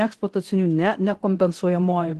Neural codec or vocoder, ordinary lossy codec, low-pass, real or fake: codec, 24 kHz, 0.9 kbps, DualCodec; Opus, 16 kbps; 10.8 kHz; fake